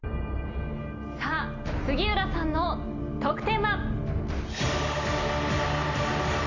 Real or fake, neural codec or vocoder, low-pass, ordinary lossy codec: real; none; 7.2 kHz; none